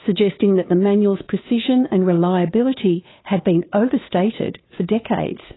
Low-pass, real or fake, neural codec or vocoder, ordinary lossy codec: 7.2 kHz; fake; codec, 16 kHz, 2 kbps, FunCodec, trained on Chinese and English, 25 frames a second; AAC, 16 kbps